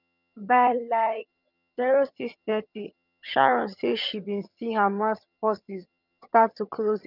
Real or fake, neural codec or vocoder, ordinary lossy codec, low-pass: fake; vocoder, 22.05 kHz, 80 mel bands, HiFi-GAN; none; 5.4 kHz